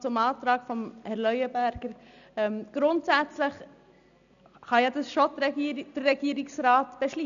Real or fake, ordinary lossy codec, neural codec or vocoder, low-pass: real; none; none; 7.2 kHz